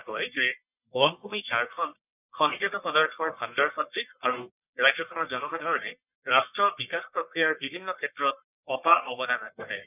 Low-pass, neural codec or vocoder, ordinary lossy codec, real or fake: 3.6 kHz; codec, 44.1 kHz, 1.7 kbps, Pupu-Codec; none; fake